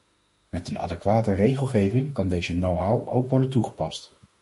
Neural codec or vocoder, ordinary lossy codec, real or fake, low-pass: autoencoder, 48 kHz, 32 numbers a frame, DAC-VAE, trained on Japanese speech; MP3, 48 kbps; fake; 14.4 kHz